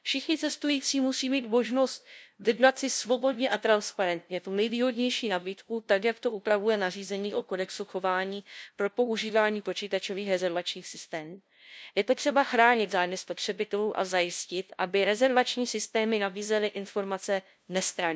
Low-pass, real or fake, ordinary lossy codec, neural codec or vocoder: none; fake; none; codec, 16 kHz, 0.5 kbps, FunCodec, trained on LibriTTS, 25 frames a second